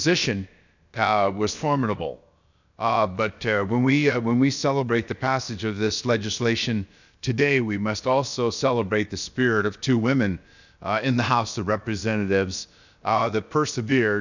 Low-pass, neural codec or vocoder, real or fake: 7.2 kHz; codec, 16 kHz, about 1 kbps, DyCAST, with the encoder's durations; fake